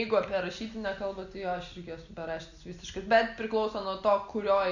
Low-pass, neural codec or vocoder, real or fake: 7.2 kHz; none; real